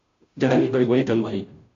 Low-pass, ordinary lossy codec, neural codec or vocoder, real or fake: 7.2 kHz; AAC, 64 kbps; codec, 16 kHz, 0.5 kbps, FunCodec, trained on Chinese and English, 25 frames a second; fake